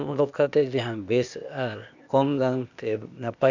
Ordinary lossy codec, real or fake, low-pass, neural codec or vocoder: none; fake; 7.2 kHz; codec, 16 kHz, 0.8 kbps, ZipCodec